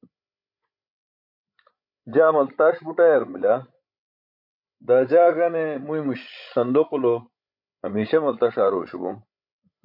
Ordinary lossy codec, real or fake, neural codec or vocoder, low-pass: MP3, 48 kbps; fake; codec, 16 kHz, 16 kbps, FreqCodec, larger model; 5.4 kHz